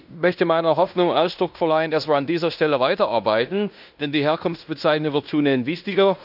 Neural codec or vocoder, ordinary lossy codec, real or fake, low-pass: codec, 16 kHz in and 24 kHz out, 0.9 kbps, LongCat-Audio-Codec, fine tuned four codebook decoder; none; fake; 5.4 kHz